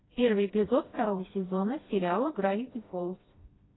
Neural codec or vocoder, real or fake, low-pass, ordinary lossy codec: codec, 16 kHz, 1 kbps, FreqCodec, smaller model; fake; 7.2 kHz; AAC, 16 kbps